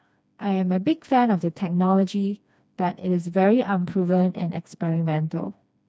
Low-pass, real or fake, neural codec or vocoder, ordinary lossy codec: none; fake; codec, 16 kHz, 2 kbps, FreqCodec, smaller model; none